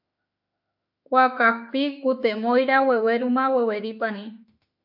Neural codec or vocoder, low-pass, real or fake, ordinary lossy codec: autoencoder, 48 kHz, 32 numbers a frame, DAC-VAE, trained on Japanese speech; 5.4 kHz; fake; AAC, 48 kbps